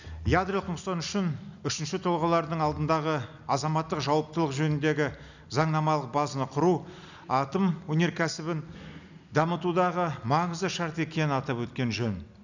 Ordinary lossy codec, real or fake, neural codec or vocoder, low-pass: none; real; none; 7.2 kHz